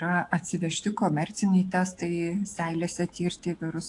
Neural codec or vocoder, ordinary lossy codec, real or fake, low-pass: autoencoder, 48 kHz, 128 numbers a frame, DAC-VAE, trained on Japanese speech; AAC, 48 kbps; fake; 10.8 kHz